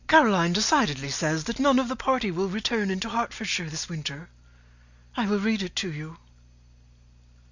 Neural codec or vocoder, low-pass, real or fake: none; 7.2 kHz; real